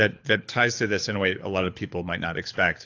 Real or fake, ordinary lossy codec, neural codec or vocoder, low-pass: fake; AAC, 48 kbps; codec, 24 kHz, 6 kbps, HILCodec; 7.2 kHz